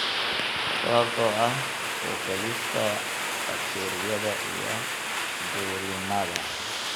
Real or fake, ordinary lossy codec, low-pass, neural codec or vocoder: real; none; none; none